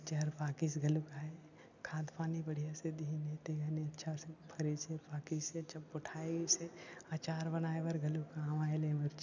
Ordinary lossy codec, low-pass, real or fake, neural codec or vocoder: none; 7.2 kHz; real; none